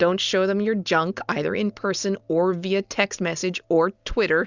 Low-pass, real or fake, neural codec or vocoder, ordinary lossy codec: 7.2 kHz; real; none; Opus, 64 kbps